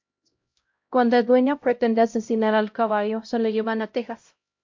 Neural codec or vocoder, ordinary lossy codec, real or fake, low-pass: codec, 16 kHz, 0.5 kbps, X-Codec, HuBERT features, trained on LibriSpeech; MP3, 48 kbps; fake; 7.2 kHz